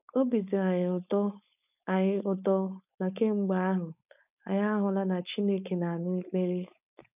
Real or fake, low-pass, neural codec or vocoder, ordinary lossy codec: fake; 3.6 kHz; codec, 16 kHz, 4.8 kbps, FACodec; none